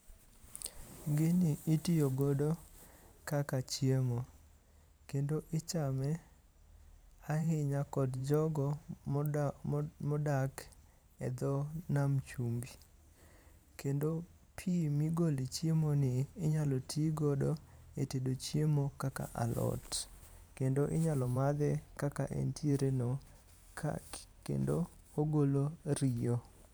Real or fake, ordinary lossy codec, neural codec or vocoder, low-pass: fake; none; vocoder, 44.1 kHz, 128 mel bands every 512 samples, BigVGAN v2; none